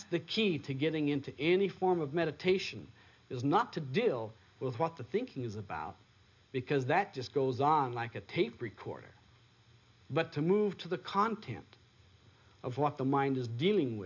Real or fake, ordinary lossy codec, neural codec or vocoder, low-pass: real; MP3, 48 kbps; none; 7.2 kHz